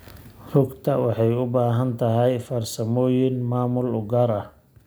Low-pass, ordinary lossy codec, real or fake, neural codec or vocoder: none; none; real; none